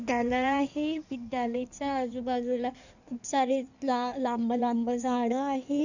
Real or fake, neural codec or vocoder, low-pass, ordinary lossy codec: fake; codec, 16 kHz in and 24 kHz out, 1.1 kbps, FireRedTTS-2 codec; 7.2 kHz; none